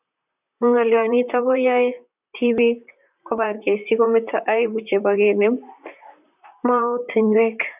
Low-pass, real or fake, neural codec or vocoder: 3.6 kHz; fake; vocoder, 44.1 kHz, 128 mel bands, Pupu-Vocoder